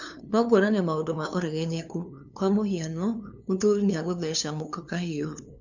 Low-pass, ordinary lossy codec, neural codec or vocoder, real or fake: 7.2 kHz; none; codec, 16 kHz, 2 kbps, FunCodec, trained on LibriTTS, 25 frames a second; fake